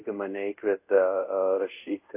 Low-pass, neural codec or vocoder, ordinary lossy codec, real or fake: 3.6 kHz; codec, 24 kHz, 0.5 kbps, DualCodec; AAC, 24 kbps; fake